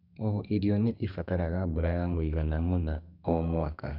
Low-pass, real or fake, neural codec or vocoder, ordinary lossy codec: 5.4 kHz; fake; codec, 32 kHz, 1.9 kbps, SNAC; none